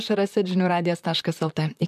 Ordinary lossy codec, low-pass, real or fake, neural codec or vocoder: MP3, 96 kbps; 14.4 kHz; fake; vocoder, 44.1 kHz, 128 mel bands, Pupu-Vocoder